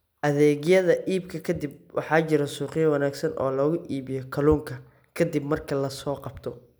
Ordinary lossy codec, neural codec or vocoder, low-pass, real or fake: none; none; none; real